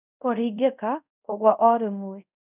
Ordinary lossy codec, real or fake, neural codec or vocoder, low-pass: none; fake; codec, 24 kHz, 0.5 kbps, DualCodec; 3.6 kHz